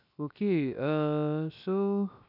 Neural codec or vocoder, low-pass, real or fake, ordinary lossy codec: codec, 16 kHz, 0.7 kbps, FocalCodec; 5.4 kHz; fake; none